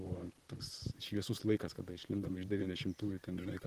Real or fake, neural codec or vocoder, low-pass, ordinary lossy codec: fake; vocoder, 44.1 kHz, 128 mel bands, Pupu-Vocoder; 14.4 kHz; Opus, 16 kbps